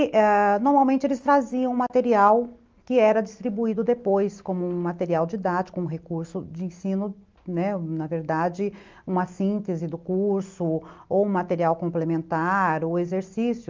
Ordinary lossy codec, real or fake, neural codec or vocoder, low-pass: Opus, 32 kbps; real; none; 7.2 kHz